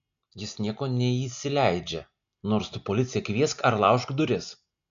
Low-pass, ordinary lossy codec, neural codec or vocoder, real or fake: 7.2 kHz; AAC, 96 kbps; none; real